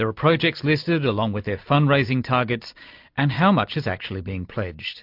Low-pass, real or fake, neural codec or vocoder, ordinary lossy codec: 5.4 kHz; real; none; MP3, 48 kbps